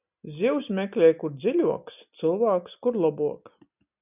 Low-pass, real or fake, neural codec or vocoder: 3.6 kHz; real; none